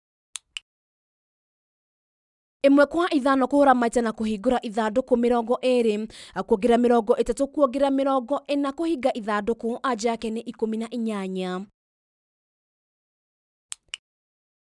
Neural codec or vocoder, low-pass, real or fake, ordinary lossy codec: none; 10.8 kHz; real; none